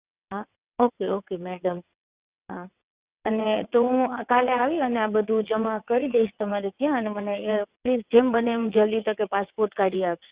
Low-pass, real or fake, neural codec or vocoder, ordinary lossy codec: 3.6 kHz; fake; vocoder, 22.05 kHz, 80 mel bands, WaveNeXt; Opus, 64 kbps